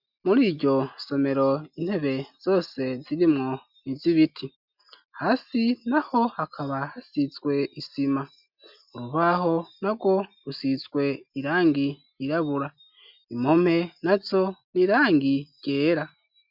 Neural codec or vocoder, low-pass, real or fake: none; 5.4 kHz; real